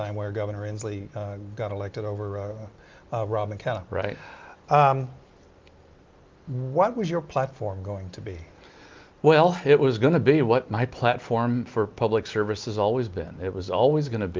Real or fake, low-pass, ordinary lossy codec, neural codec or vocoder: real; 7.2 kHz; Opus, 32 kbps; none